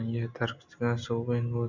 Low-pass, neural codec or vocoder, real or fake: 7.2 kHz; none; real